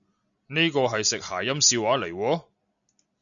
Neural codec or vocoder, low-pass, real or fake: none; 7.2 kHz; real